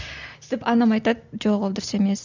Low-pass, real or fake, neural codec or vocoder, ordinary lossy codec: 7.2 kHz; real; none; none